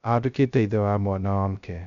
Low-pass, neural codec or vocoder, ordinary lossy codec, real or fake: 7.2 kHz; codec, 16 kHz, 0.2 kbps, FocalCodec; none; fake